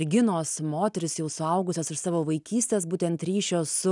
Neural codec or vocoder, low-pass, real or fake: none; 10.8 kHz; real